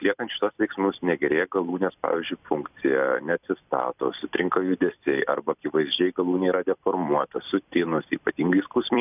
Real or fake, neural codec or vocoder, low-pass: fake; vocoder, 44.1 kHz, 128 mel bands every 256 samples, BigVGAN v2; 3.6 kHz